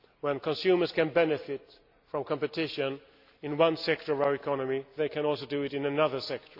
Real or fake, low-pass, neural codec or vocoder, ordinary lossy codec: real; 5.4 kHz; none; AAC, 48 kbps